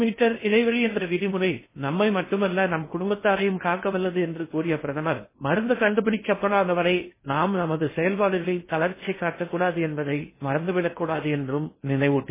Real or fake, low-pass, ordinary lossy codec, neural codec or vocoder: fake; 3.6 kHz; MP3, 16 kbps; codec, 16 kHz in and 24 kHz out, 0.8 kbps, FocalCodec, streaming, 65536 codes